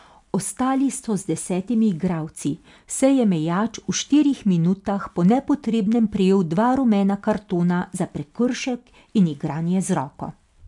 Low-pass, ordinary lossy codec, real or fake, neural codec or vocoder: 10.8 kHz; MP3, 96 kbps; real; none